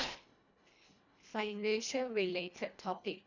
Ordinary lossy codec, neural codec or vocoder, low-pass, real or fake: none; codec, 24 kHz, 1.5 kbps, HILCodec; 7.2 kHz; fake